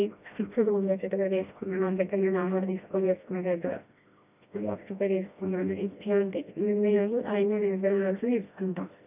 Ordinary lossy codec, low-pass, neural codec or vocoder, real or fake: none; 3.6 kHz; codec, 16 kHz, 1 kbps, FreqCodec, smaller model; fake